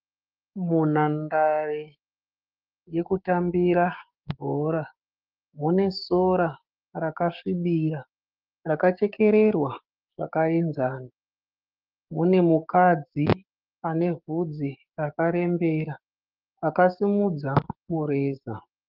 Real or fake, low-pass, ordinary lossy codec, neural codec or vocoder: fake; 5.4 kHz; Opus, 32 kbps; codec, 16 kHz, 6 kbps, DAC